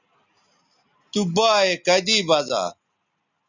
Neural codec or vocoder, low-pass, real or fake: none; 7.2 kHz; real